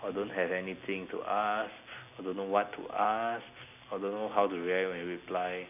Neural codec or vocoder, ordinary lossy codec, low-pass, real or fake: none; none; 3.6 kHz; real